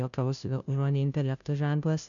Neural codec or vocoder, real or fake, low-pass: codec, 16 kHz, 0.5 kbps, FunCodec, trained on Chinese and English, 25 frames a second; fake; 7.2 kHz